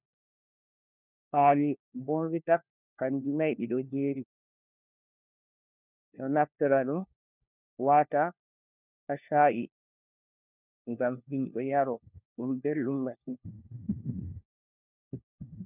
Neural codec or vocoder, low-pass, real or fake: codec, 16 kHz, 1 kbps, FunCodec, trained on LibriTTS, 50 frames a second; 3.6 kHz; fake